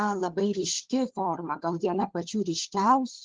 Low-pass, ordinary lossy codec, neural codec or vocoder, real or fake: 7.2 kHz; Opus, 16 kbps; codec, 16 kHz, 4 kbps, FunCodec, trained on LibriTTS, 50 frames a second; fake